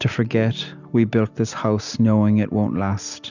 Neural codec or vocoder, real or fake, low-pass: none; real; 7.2 kHz